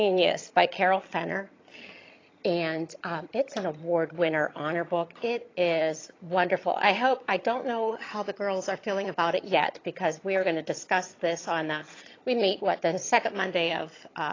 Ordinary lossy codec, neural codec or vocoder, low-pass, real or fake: AAC, 32 kbps; vocoder, 22.05 kHz, 80 mel bands, HiFi-GAN; 7.2 kHz; fake